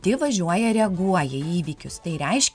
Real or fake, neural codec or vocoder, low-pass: real; none; 9.9 kHz